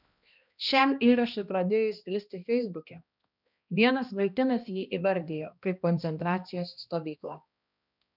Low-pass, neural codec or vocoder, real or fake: 5.4 kHz; codec, 16 kHz, 1 kbps, X-Codec, HuBERT features, trained on balanced general audio; fake